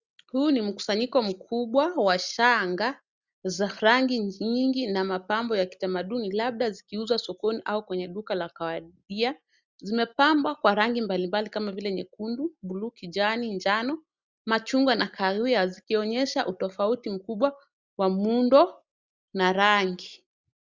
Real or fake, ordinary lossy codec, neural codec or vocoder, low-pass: real; Opus, 64 kbps; none; 7.2 kHz